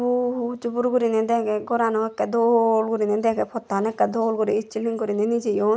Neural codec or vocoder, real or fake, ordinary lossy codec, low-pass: none; real; none; none